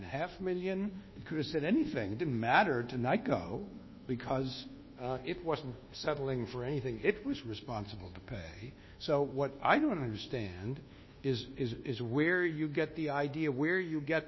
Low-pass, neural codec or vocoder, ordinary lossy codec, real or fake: 7.2 kHz; codec, 24 kHz, 1.2 kbps, DualCodec; MP3, 24 kbps; fake